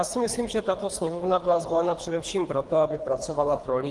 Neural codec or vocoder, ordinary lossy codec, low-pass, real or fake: codec, 24 kHz, 3 kbps, HILCodec; Opus, 24 kbps; 10.8 kHz; fake